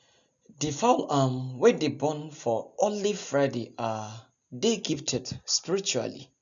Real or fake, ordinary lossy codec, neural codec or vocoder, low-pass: real; none; none; 7.2 kHz